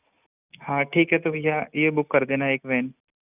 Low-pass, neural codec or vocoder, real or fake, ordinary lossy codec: 3.6 kHz; none; real; none